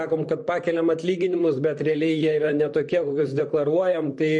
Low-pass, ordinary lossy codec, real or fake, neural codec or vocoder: 10.8 kHz; MP3, 48 kbps; fake; vocoder, 44.1 kHz, 128 mel bands, Pupu-Vocoder